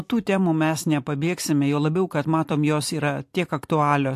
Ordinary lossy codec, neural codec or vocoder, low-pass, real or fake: AAC, 64 kbps; vocoder, 44.1 kHz, 128 mel bands every 256 samples, BigVGAN v2; 14.4 kHz; fake